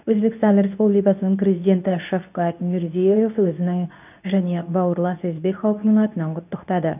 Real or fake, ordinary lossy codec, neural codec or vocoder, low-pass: fake; none; codec, 24 kHz, 0.9 kbps, WavTokenizer, medium speech release version 2; 3.6 kHz